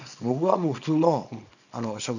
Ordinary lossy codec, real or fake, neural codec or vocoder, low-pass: none; fake; codec, 24 kHz, 0.9 kbps, WavTokenizer, small release; 7.2 kHz